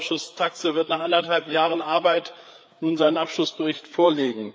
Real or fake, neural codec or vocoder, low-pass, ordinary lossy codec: fake; codec, 16 kHz, 4 kbps, FreqCodec, larger model; none; none